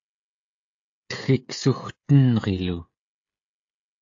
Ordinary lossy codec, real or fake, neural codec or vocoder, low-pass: AAC, 48 kbps; fake; codec, 16 kHz, 16 kbps, FreqCodec, smaller model; 7.2 kHz